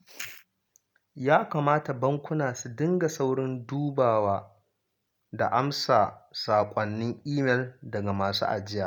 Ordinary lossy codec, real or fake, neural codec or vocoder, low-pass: none; real; none; 19.8 kHz